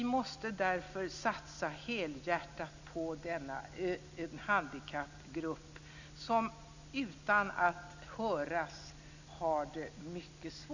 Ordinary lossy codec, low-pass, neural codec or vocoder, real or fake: none; 7.2 kHz; none; real